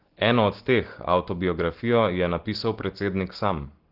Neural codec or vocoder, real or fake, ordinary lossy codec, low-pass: none; real; Opus, 16 kbps; 5.4 kHz